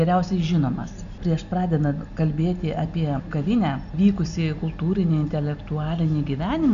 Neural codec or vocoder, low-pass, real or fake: none; 7.2 kHz; real